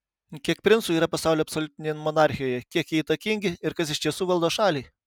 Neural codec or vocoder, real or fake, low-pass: none; real; 19.8 kHz